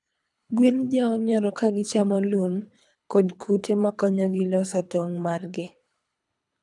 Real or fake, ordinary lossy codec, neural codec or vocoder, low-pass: fake; none; codec, 24 kHz, 3 kbps, HILCodec; 10.8 kHz